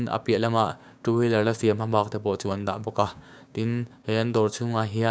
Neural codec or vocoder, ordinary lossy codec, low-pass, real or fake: codec, 16 kHz, 6 kbps, DAC; none; none; fake